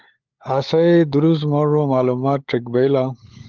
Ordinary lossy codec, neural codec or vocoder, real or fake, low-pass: Opus, 32 kbps; codec, 16 kHz, 16 kbps, FunCodec, trained on LibriTTS, 50 frames a second; fake; 7.2 kHz